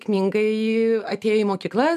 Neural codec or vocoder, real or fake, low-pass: none; real; 14.4 kHz